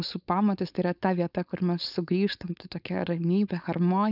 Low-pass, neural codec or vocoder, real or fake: 5.4 kHz; codec, 16 kHz, 4.8 kbps, FACodec; fake